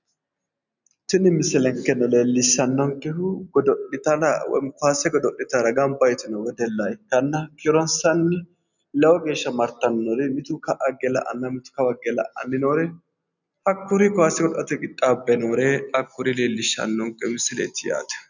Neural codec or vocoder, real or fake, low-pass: none; real; 7.2 kHz